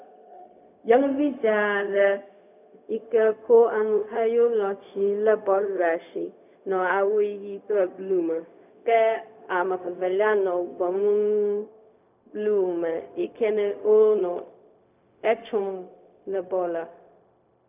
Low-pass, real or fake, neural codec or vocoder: 3.6 kHz; fake; codec, 16 kHz, 0.4 kbps, LongCat-Audio-Codec